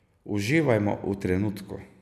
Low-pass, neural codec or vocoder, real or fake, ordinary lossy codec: 14.4 kHz; none; real; none